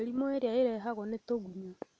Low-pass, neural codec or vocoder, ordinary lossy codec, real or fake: none; none; none; real